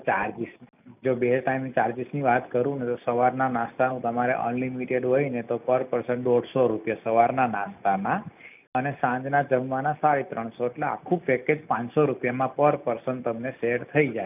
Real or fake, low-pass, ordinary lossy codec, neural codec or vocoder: real; 3.6 kHz; none; none